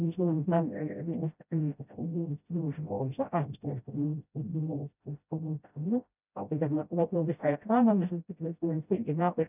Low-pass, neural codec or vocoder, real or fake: 3.6 kHz; codec, 16 kHz, 0.5 kbps, FreqCodec, smaller model; fake